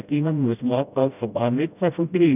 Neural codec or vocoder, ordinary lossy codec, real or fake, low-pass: codec, 16 kHz, 0.5 kbps, FreqCodec, smaller model; none; fake; 3.6 kHz